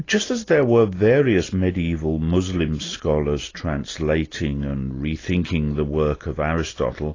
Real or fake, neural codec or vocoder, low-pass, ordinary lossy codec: real; none; 7.2 kHz; AAC, 32 kbps